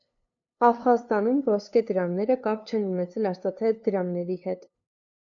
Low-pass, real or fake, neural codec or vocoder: 7.2 kHz; fake; codec, 16 kHz, 2 kbps, FunCodec, trained on LibriTTS, 25 frames a second